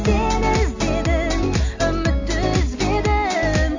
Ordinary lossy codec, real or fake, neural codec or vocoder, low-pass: AAC, 48 kbps; real; none; 7.2 kHz